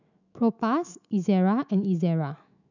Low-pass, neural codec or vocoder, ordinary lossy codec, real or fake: 7.2 kHz; autoencoder, 48 kHz, 128 numbers a frame, DAC-VAE, trained on Japanese speech; none; fake